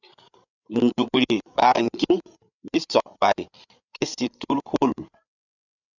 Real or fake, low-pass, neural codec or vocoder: fake; 7.2 kHz; codec, 16 kHz, 8 kbps, FreqCodec, larger model